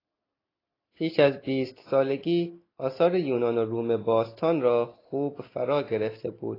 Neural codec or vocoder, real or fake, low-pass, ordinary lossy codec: none; real; 5.4 kHz; AAC, 24 kbps